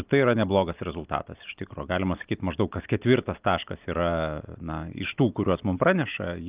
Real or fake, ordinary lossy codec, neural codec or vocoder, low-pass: real; Opus, 24 kbps; none; 3.6 kHz